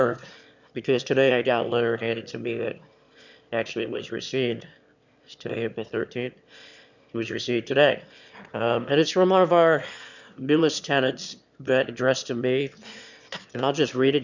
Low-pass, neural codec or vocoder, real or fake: 7.2 kHz; autoencoder, 22.05 kHz, a latent of 192 numbers a frame, VITS, trained on one speaker; fake